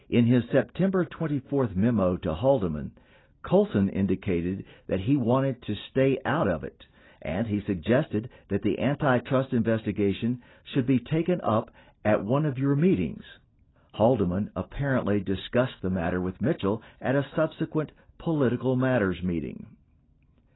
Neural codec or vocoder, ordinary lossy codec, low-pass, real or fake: none; AAC, 16 kbps; 7.2 kHz; real